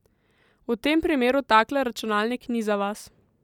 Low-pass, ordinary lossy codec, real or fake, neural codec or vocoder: 19.8 kHz; none; real; none